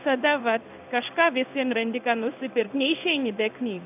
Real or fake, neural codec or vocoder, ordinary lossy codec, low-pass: fake; codec, 16 kHz in and 24 kHz out, 1 kbps, XY-Tokenizer; AAC, 32 kbps; 3.6 kHz